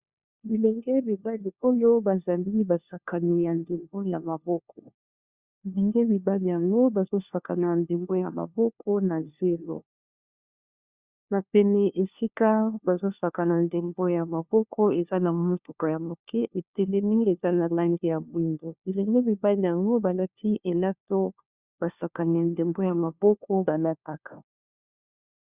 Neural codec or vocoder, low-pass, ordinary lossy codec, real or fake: codec, 16 kHz, 1 kbps, FunCodec, trained on LibriTTS, 50 frames a second; 3.6 kHz; Opus, 64 kbps; fake